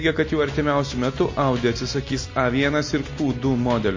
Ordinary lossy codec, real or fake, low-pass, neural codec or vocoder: MP3, 32 kbps; real; 7.2 kHz; none